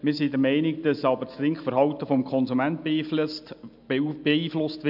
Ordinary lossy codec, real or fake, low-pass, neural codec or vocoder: AAC, 48 kbps; real; 5.4 kHz; none